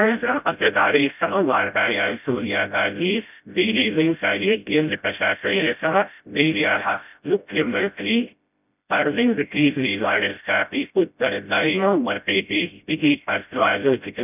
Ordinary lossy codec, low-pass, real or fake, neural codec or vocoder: none; 3.6 kHz; fake; codec, 16 kHz, 0.5 kbps, FreqCodec, smaller model